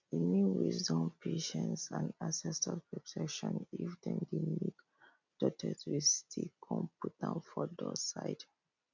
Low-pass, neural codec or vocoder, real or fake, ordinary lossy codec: 7.2 kHz; none; real; none